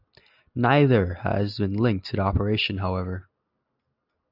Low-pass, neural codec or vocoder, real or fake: 5.4 kHz; none; real